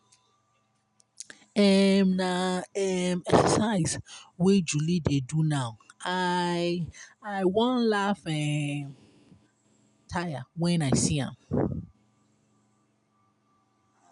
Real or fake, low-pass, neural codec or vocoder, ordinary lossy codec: real; 10.8 kHz; none; none